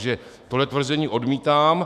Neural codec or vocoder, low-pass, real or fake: autoencoder, 48 kHz, 128 numbers a frame, DAC-VAE, trained on Japanese speech; 14.4 kHz; fake